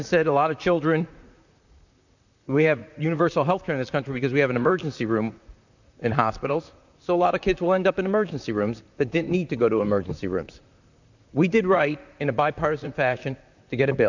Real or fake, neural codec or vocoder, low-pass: fake; vocoder, 44.1 kHz, 128 mel bands, Pupu-Vocoder; 7.2 kHz